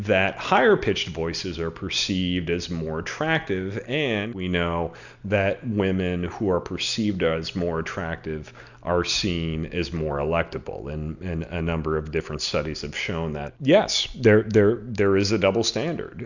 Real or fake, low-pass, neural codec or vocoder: real; 7.2 kHz; none